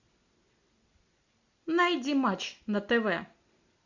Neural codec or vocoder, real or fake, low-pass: none; real; 7.2 kHz